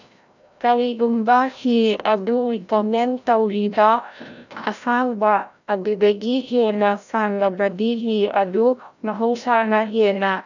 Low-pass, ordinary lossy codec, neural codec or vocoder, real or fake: 7.2 kHz; none; codec, 16 kHz, 0.5 kbps, FreqCodec, larger model; fake